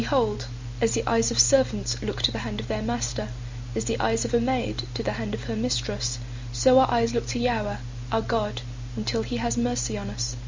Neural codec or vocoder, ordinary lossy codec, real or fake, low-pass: none; MP3, 64 kbps; real; 7.2 kHz